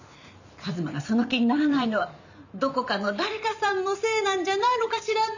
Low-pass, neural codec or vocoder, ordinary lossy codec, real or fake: 7.2 kHz; vocoder, 44.1 kHz, 128 mel bands every 512 samples, BigVGAN v2; none; fake